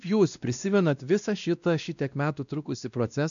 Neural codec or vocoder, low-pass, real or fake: codec, 16 kHz, 1 kbps, X-Codec, WavLM features, trained on Multilingual LibriSpeech; 7.2 kHz; fake